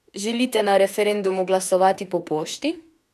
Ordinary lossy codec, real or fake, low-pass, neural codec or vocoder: none; fake; 14.4 kHz; autoencoder, 48 kHz, 32 numbers a frame, DAC-VAE, trained on Japanese speech